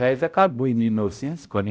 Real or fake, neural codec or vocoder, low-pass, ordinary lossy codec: fake; codec, 16 kHz, 0.5 kbps, X-Codec, HuBERT features, trained on balanced general audio; none; none